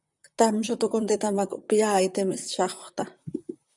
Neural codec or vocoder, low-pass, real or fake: vocoder, 44.1 kHz, 128 mel bands, Pupu-Vocoder; 10.8 kHz; fake